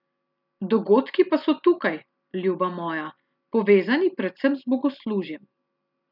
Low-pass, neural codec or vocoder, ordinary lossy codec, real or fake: 5.4 kHz; none; none; real